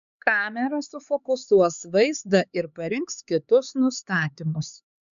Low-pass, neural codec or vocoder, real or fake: 7.2 kHz; codec, 16 kHz, 4 kbps, X-Codec, HuBERT features, trained on LibriSpeech; fake